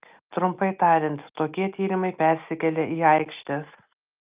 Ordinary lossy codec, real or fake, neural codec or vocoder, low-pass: Opus, 32 kbps; real; none; 3.6 kHz